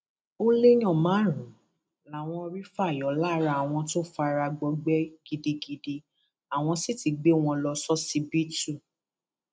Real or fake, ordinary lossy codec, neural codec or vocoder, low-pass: real; none; none; none